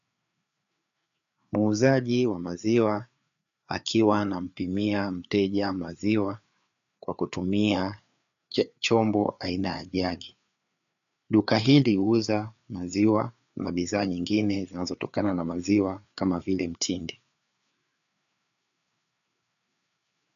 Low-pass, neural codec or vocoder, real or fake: 7.2 kHz; codec, 16 kHz, 4 kbps, FreqCodec, larger model; fake